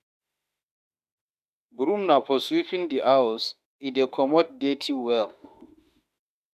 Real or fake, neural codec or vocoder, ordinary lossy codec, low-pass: fake; autoencoder, 48 kHz, 32 numbers a frame, DAC-VAE, trained on Japanese speech; none; 14.4 kHz